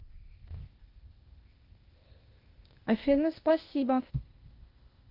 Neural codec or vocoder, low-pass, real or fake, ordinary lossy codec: codec, 16 kHz, 0.8 kbps, ZipCodec; 5.4 kHz; fake; Opus, 32 kbps